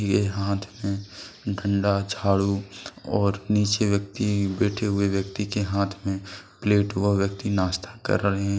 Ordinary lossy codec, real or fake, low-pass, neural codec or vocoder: none; real; none; none